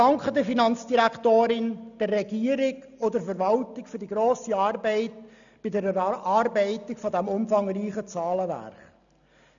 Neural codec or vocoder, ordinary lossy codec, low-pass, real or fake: none; none; 7.2 kHz; real